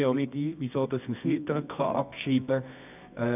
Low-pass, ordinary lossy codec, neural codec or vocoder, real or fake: 3.6 kHz; none; codec, 24 kHz, 0.9 kbps, WavTokenizer, medium music audio release; fake